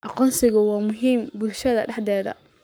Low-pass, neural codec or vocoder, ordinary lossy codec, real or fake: none; codec, 44.1 kHz, 7.8 kbps, Pupu-Codec; none; fake